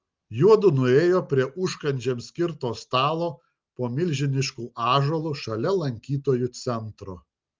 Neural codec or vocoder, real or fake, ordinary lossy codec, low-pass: none; real; Opus, 32 kbps; 7.2 kHz